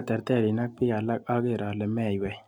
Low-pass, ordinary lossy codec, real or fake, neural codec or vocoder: 19.8 kHz; MP3, 96 kbps; real; none